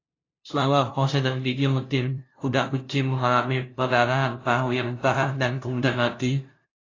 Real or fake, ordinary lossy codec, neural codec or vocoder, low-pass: fake; AAC, 32 kbps; codec, 16 kHz, 0.5 kbps, FunCodec, trained on LibriTTS, 25 frames a second; 7.2 kHz